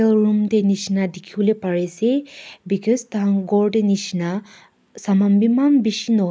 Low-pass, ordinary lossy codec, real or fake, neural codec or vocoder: none; none; real; none